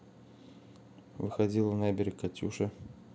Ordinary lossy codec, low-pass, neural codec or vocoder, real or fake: none; none; none; real